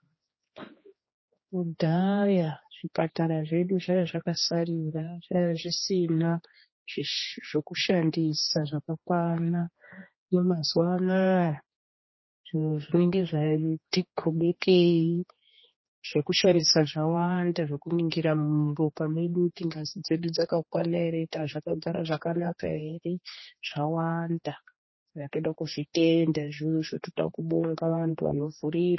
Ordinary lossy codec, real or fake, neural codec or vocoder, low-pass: MP3, 24 kbps; fake; codec, 16 kHz, 2 kbps, X-Codec, HuBERT features, trained on general audio; 7.2 kHz